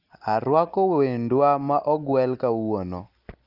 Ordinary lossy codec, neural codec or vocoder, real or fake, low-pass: Opus, 24 kbps; none; real; 5.4 kHz